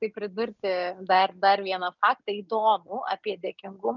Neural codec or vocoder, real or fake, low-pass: none; real; 7.2 kHz